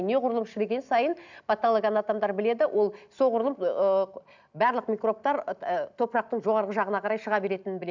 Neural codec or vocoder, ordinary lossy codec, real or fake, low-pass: none; none; real; 7.2 kHz